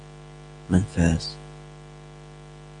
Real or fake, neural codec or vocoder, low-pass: real; none; 9.9 kHz